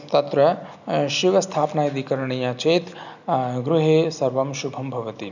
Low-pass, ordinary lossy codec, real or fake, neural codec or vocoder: 7.2 kHz; none; real; none